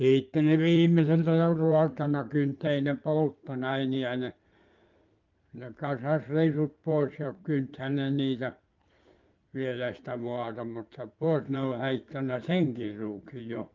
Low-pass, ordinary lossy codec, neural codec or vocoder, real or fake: 7.2 kHz; Opus, 32 kbps; codec, 16 kHz in and 24 kHz out, 2.2 kbps, FireRedTTS-2 codec; fake